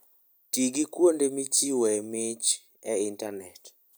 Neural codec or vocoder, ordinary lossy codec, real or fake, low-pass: none; none; real; none